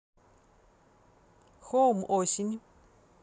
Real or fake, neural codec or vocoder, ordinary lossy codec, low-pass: real; none; none; none